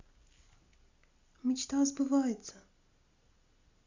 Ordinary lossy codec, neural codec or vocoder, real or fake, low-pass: Opus, 64 kbps; none; real; 7.2 kHz